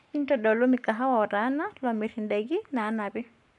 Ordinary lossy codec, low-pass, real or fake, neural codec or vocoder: none; 10.8 kHz; fake; codec, 44.1 kHz, 7.8 kbps, Pupu-Codec